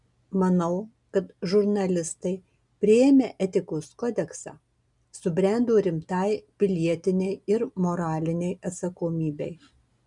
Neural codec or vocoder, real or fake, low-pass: none; real; 10.8 kHz